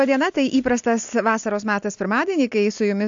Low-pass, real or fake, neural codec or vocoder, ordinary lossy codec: 7.2 kHz; real; none; AAC, 64 kbps